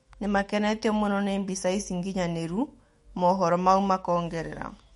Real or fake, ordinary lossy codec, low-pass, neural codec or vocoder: fake; MP3, 48 kbps; 19.8 kHz; autoencoder, 48 kHz, 128 numbers a frame, DAC-VAE, trained on Japanese speech